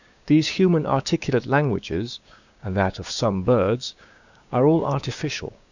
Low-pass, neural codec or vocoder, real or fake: 7.2 kHz; codec, 16 kHz, 6 kbps, DAC; fake